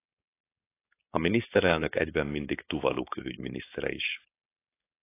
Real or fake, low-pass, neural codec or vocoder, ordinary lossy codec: fake; 3.6 kHz; codec, 16 kHz, 4.8 kbps, FACodec; AAC, 24 kbps